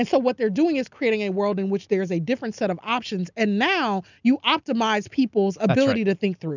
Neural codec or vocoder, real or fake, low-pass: none; real; 7.2 kHz